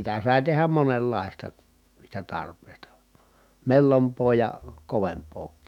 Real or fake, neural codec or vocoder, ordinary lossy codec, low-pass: fake; autoencoder, 48 kHz, 128 numbers a frame, DAC-VAE, trained on Japanese speech; none; 19.8 kHz